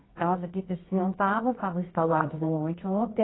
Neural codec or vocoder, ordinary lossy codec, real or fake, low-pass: codec, 24 kHz, 0.9 kbps, WavTokenizer, medium music audio release; AAC, 16 kbps; fake; 7.2 kHz